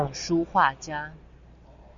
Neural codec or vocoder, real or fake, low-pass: none; real; 7.2 kHz